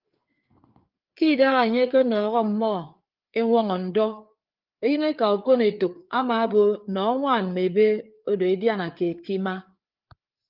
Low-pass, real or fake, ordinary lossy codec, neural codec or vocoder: 5.4 kHz; fake; Opus, 32 kbps; codec, 16 kHz, 4 kbps, FreqCodec, larger model